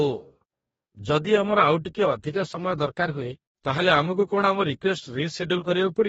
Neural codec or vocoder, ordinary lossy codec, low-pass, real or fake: codec, 44.1 kHz, 2.6 kbps, DAC; AAC, 24 kbps; 19.8 kHz; fake